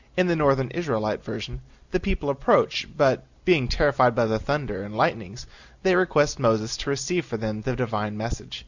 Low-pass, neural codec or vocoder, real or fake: 7.2 kHz; none; real